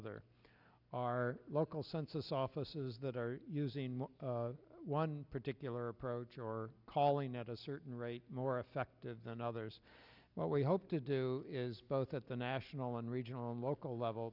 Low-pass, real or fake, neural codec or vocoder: 5.4 kHz; real; none